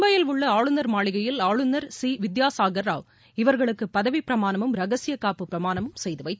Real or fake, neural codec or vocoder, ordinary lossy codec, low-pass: real; none; none; none